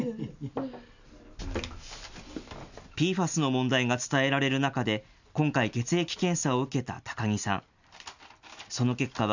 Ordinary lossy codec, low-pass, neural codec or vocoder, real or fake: none; 7.2 kHz; none; real